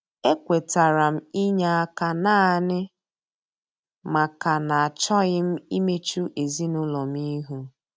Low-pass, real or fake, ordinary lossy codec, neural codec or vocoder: none; real; none; none